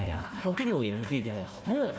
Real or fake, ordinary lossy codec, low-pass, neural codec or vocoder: fake; none; none; codec, 16 kHz, 1 kbps, FunCodec, trained on Chinese and English, 50 frames a second